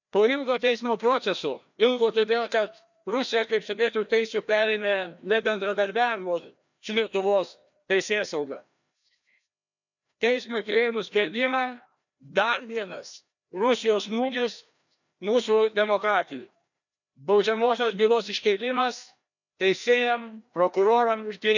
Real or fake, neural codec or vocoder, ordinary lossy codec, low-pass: fake; codec, 16 kHz, 1 kbps, FreqCodec, larger model; none; 7.2 kHz